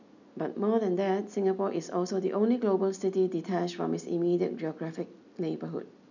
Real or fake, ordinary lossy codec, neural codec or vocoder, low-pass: real; none; none; 7.2 kHz